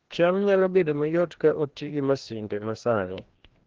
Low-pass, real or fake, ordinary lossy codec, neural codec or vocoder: 7.2 kHz; fake; Opus, 16 kbps; codec, 16 kHz, 1 kbps, FreqCodec, larger model